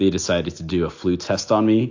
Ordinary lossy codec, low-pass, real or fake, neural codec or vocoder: AAC, 48 kbps; 7.2 kHz; real; none